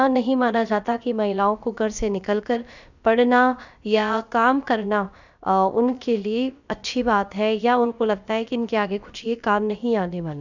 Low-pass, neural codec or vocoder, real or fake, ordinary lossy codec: 7.2 kHz; codec, 16 kHz, about 1 kbps, DyCAST, with the encoder's durations; fake; none